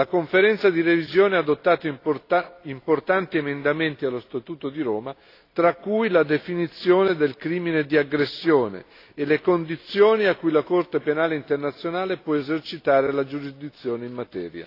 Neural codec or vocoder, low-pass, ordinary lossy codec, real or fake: none; 5.4 kHz; AAC, 32 kbps; real